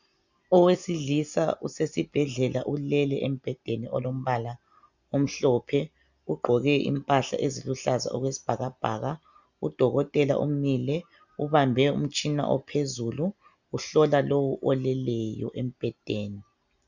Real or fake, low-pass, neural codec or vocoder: real; 7.2 kHz; none